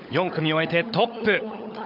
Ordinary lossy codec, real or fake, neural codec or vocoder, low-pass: none; fake; codec, 16 kHz, 16 kbps, FunCodec, trained on LibriTTS, 50 frames a second; 5.4 kHz